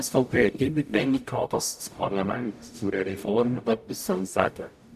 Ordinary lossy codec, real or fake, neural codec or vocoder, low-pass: none; fake; codec, 44.1 kHz, 0.9 kbps, DAC; 14.4 kHz